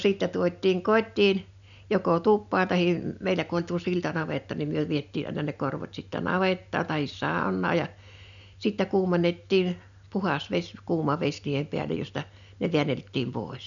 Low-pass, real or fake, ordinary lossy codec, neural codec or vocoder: 7.2 kHz; real; none; none